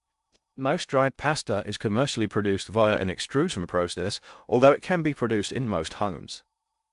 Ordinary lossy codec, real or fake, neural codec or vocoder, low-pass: AAC, 96 kbps; fake; codec, 16 kHz in and 24 kHz out, 0.6 kbps, FocalCodec, streaming, 2048 codes; 10.8 kHz